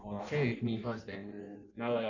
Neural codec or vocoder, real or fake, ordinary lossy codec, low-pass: codec, 16 kHz in and 24 kHz out, 0.6 kbps, FireRedTTS-2 codec; fake; none; 7.2 kHz